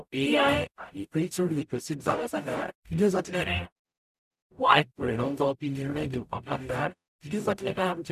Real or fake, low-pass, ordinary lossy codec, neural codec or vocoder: fake; 14.4 kHz; none; codec, 44.1 kHz, 0.9 kbps, DAC